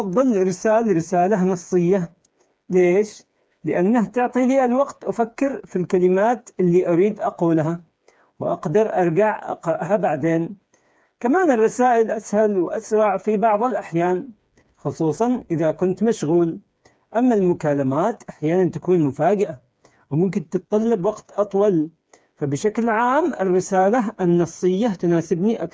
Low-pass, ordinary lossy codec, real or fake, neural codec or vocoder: none; none; fake; codec, 16 kHz, 4 kbps, FreqCodec, smaller model